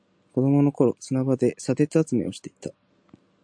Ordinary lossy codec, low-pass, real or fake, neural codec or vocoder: AAC, 64 kbps; 9.9 kHz; real; none